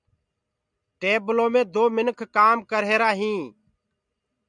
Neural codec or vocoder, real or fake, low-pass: none; real; 9.9 kHz